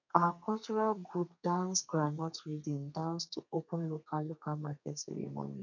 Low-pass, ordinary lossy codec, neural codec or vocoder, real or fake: 7.2 kHz; none; codec, 32 kHz, 1.9 kbps, SNAC; fake